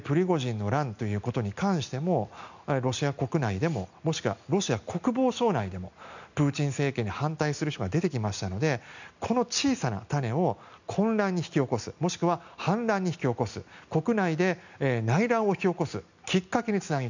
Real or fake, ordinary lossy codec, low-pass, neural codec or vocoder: real; none; 7.2 kHz; none